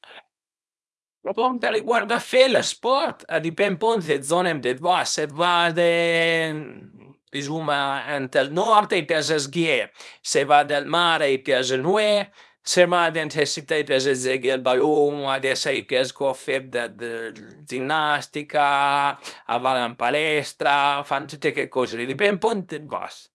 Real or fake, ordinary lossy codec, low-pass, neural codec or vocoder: fake; none; none; codec, 24 kHz, 0.9 kbps, WavTokenizer, small release